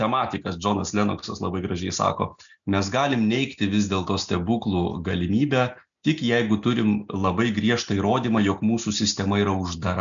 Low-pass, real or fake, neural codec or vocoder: 7.2 kHz; real; none